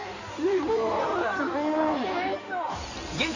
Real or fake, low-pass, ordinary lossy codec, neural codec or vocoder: fake; 7.2 kHz; none; codec, 16 kHz in and 24 kHz out, 2.2 kbps, FireRedTTS-2 codec